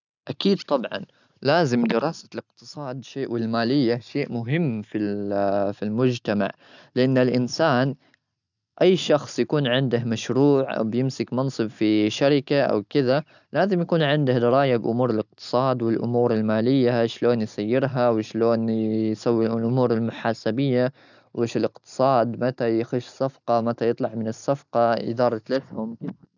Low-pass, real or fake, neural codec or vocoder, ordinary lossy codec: 7.2 kHz; real; none; none